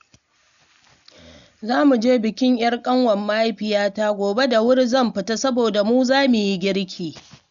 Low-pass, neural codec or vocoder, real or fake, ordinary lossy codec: 7.2 kHz; none; real; none